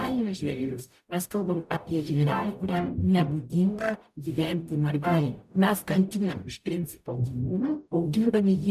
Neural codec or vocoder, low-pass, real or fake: codec, 44.1 kHz, 0.9 kbps, DAC; 14.4 kHz; fake